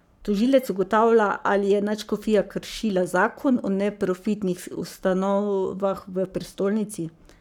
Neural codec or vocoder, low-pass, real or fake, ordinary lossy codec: codec, 44.1 kHz, 7.8 kbps, Pupu-Codec; 19.8 kHz; fake; none